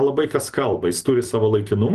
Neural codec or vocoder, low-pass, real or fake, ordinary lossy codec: vocoder, 44.1 kHz, 128 mel bands every 256 samples, BigVGAN v2; 14.4 kHz; fake; Opus, 24 kbps